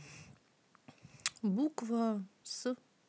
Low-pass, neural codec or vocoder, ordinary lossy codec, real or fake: none; none; none; real